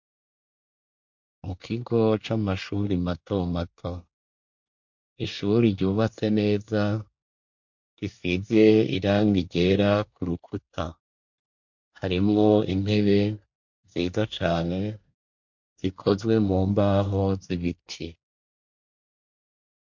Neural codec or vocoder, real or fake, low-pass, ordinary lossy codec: codec, 24 kHz, 1 kbps, SNAC; fake; 7.2 kHz; MP3, 48 kbps